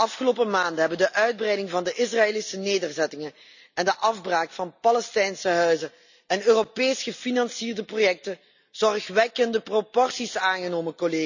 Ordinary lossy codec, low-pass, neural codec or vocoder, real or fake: none; 7.2 kHz; none; real